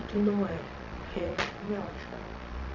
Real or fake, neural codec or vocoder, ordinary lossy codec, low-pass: fake; vocoder, 22.05 kHz, 80 mel bands, Vocos; none; 7.2 kHz